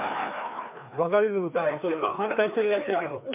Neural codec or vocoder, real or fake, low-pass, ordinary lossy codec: codec, 16 kHz, 2 kbps, FreqCodec, larger model; fake; 3.6 kHz; MP3, 32 kbps